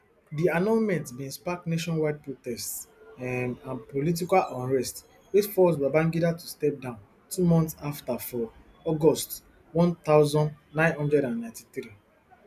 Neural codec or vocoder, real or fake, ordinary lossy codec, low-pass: none; real; none; 14.4 kHz